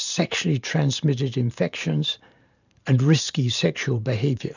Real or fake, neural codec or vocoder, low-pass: fake; vocoder, 44.1 kHz, 128 mel bands every 512 samples, BigVGAN v2; 7.2 kHz